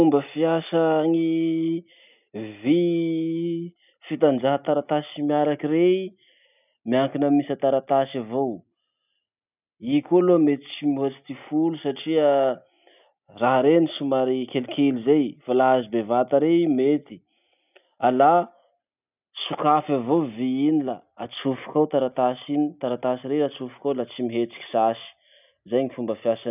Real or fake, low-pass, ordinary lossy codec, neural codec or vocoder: real; 3.6 kHz; none; none